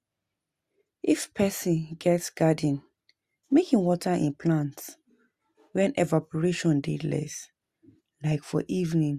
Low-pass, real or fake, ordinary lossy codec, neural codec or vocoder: 14.4 kHz; real; Opus, 64 kbps; none